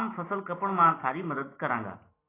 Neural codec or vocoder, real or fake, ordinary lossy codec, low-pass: none; real; AAC, 16 kbps; 3.6 kHz